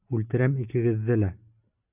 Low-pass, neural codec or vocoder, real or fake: 3.6 kHz; none; real